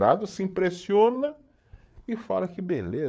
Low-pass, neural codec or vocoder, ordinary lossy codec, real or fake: none; codec, 16 kHz, 8 kbps, FreqCodec, larger model; none; fake